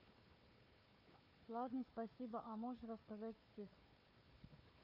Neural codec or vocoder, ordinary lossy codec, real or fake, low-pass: codec, 16 kHz, 4 kbps, FunCodec, trained on Chinese and English, 50 frames a second; none; fake; 5.4 kHz